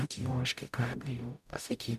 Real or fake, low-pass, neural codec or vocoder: fake; 14.4 kHz; codec, 44.1 kHz, 0.9 kbps, DAC